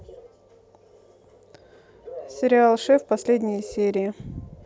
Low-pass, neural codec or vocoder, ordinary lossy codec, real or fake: none; none; none; real